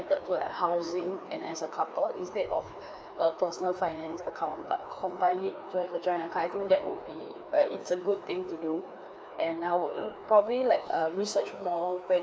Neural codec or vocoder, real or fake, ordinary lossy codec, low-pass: codec, 16 kHz, 2 kbps, FreqCodec, larger model; fake; none; none